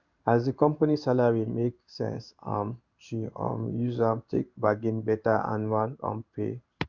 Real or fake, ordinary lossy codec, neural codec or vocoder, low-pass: fake; Opus, 64 kbps; codec, 16 kHz in and 24 kHz out, 1 kbps, XY-Tokenizer; 7.2 kHz